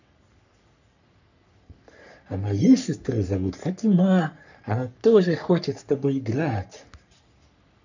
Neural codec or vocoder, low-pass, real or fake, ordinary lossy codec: codec, 44.1 kHz, 3.4 kbps, Pupu-Codec; 7.2 kHz; fake; none